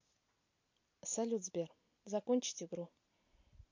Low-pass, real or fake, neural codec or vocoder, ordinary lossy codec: 7.2 kHz; real; none; MP3, 48 kbps